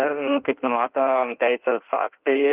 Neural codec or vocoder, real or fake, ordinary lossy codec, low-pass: codec, 16 kHz in and 24 kHz out, 1.1 kbps, FireRedTTS-2 codec; fake; Opus, 32 kbps; 3.6 kHz